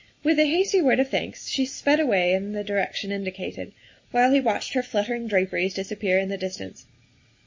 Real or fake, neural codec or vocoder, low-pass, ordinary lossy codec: real; none; 7.2 kHz; MP3, 32 kbps